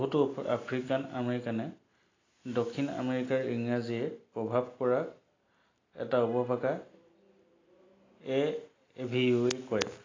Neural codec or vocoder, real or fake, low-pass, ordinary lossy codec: none; real; 7.2 kHz; AAC, 32 kbps